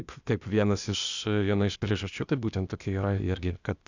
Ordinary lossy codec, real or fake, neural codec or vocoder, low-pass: Opus, 64 kbps; fake; codec, 16 kHz, 0.8 kbps, ZipCodec; 7.2 kHz